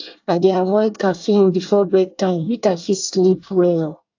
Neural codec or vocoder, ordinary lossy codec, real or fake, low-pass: codec, 24 kHz, 1 kbps, SNAC; AAC, 48 kbps; fake; 7.2 kHz